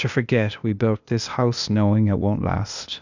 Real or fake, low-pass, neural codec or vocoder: fake; 7.2 kHz; codec, 16 kHz, 0.8 kbps, ZipCodec